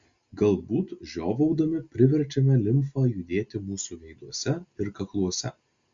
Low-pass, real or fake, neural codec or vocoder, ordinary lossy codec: 7.2 kHz; real; none; Opus, 64 kbps